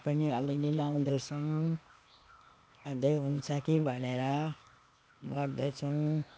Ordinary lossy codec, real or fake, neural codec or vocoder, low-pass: none; fake; codec, 16 kHz, 0.8 kbps, ZipCodec; none